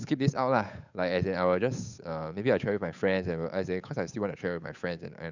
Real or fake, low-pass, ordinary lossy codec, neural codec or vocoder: fake; 7.2 kHz; none; codec, 16 kHz, 8 kbps, FunCodec, trained on Chinese and English, 25 frames a second